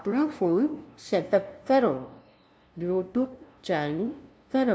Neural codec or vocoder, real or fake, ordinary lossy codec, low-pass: codec, 16 kHz, 0.5 kbps, FunCodec, trained on LibriTTS, 25 frames a second; fake; none; none